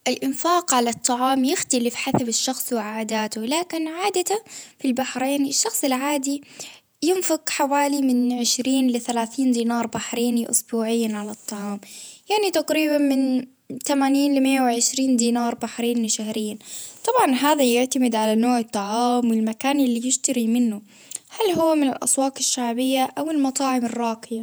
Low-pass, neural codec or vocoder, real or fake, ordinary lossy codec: none; vocoder, 48 kHz, 128 mel bands, Vocos; fake; none